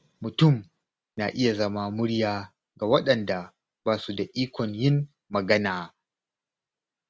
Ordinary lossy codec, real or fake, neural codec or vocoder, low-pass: none; real; none; none